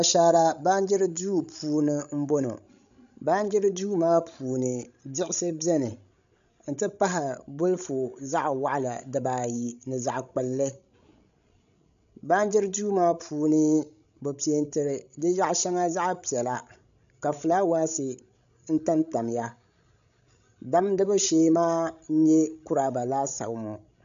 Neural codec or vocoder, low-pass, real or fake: codec, 16 kHz, 16 kbps, FreqCodec, larger model; 7.2 kHz; fake